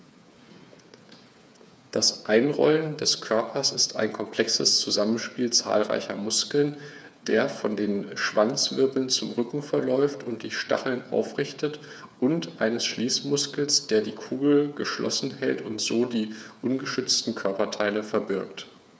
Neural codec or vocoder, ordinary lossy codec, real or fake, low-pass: codec, 16 kHz, 8 kbps, FreqCodec, smaller model; none; fake; none